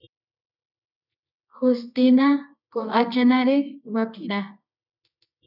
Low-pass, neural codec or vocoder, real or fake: 5.4 kHz; codec, 24 kHz, 0.9 kbps, WavTokenizer, medium music audio release; fake